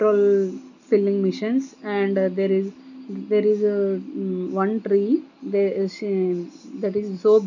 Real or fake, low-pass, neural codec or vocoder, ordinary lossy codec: real; 7.2 kHz; none; none